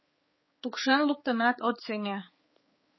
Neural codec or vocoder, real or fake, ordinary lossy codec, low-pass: codec, 16 kHz, 4 kbps, X-Codec, HuBERT features, trained on balanced general audio; fake; MP3, 24 kbps; 7.2 kHz